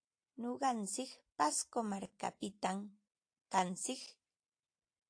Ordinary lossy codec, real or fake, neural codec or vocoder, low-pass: AAC, 48 kbps; fake; vocoder, 44.1 kHz, 128 mel bands every 256 samples, BigVGAN v2; 9.9 kHz